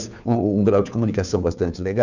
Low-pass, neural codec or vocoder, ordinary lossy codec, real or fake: 7.2 kHz; codec, 16 kHz, 2 kbps, FunCodec, trained on Chinese and English, 25 frames a second; none; fake